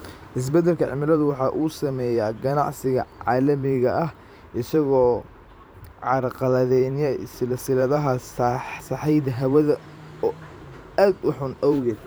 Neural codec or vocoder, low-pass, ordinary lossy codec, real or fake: vocoder, 44.1 kHz, 128 mel bands every 512 samples, BigVGAN v2; none; none; fake